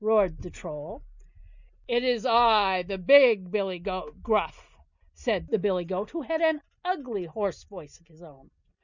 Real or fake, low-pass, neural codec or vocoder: real; 7.2 kHz; none